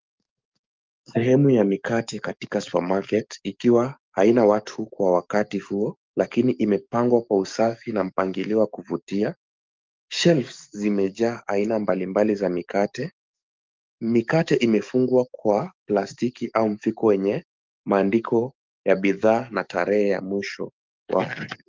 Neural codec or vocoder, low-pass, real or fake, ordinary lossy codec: codec, 44.1 kHz, 7.8 kbps, DAC; 7.2 kHz; fake; Opus, 24 kbps